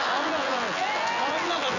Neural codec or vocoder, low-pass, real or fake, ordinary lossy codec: vocoder, 24 kHz, 100 mel bands, Vocos; 7.2 kHz; fake; none